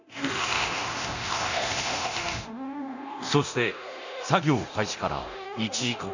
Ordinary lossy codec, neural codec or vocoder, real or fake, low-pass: none; codec, 24 kHz, 0.9 kbps, DualCodec; fake; 7.2 kHz